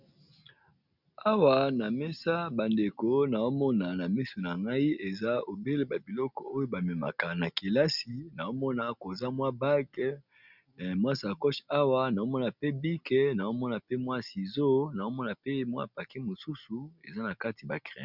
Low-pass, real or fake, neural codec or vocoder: 5.4 kHz; real; none